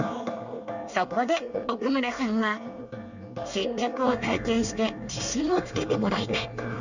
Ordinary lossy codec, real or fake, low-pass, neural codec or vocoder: none; fake; 7.2 kHz; codec, 24 kHz, 1 kbps, SNAC